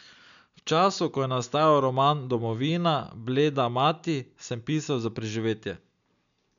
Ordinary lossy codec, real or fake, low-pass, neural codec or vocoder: none; real; 7.2 kHz; none